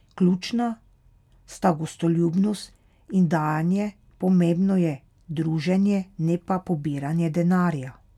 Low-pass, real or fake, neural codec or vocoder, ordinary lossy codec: 19.8 kHz; real; none; none